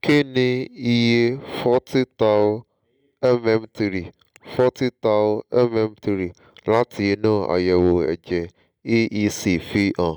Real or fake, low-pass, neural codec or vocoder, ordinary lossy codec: real; none; none; none